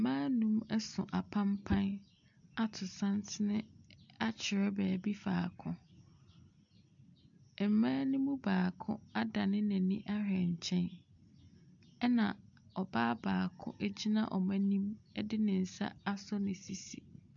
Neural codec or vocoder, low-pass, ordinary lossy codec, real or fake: none; 7.2 kHz; AAC, 64 kbps; real